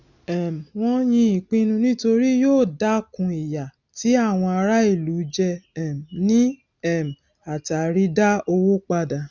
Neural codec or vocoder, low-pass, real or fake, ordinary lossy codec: none; 7.2 kHz; real; none